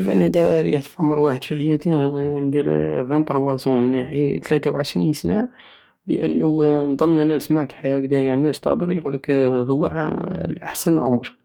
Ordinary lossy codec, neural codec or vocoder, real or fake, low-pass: none; codec, 44.1 kHz, 2.6 kbps, DAC; fake; 19.8 kHz